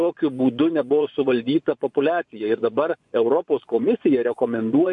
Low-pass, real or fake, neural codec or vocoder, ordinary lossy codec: 10.8 kHz; fake; vocoder, 24 kHz, 100 mel bands, Vocos; MP3, 64 kbps